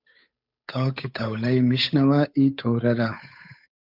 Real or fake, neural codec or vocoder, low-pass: fake; codec, 16 kHz, 8 kbps, FunCodec, trained on Chinese and English, 25 frames a second; 5.4 kHz